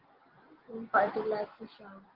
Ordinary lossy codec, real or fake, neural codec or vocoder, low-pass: Opus, 16 kbps; real; none; 5.4 kHz